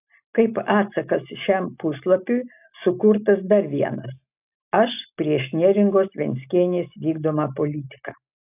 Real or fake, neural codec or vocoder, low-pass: real; none; 3.6 kHz